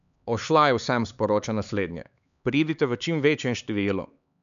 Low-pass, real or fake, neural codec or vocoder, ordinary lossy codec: 7.2 kHz; fake; codec, 16 kHz, 4 kbps, X-Codec, HuBERT features, trained on LibriSpeech; none